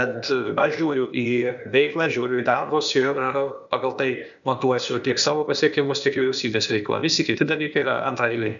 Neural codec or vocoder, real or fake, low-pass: codec, 16 kHz, 0.8 kbps, ZipCodec; fake; 7.2 kHz